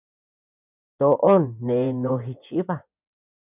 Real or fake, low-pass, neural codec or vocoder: fake; 3.6 kHz; vocoder, 24 kHz, 100 mel bands, Vocos